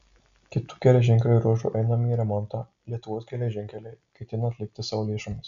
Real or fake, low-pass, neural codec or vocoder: real; 7.2 kHz; none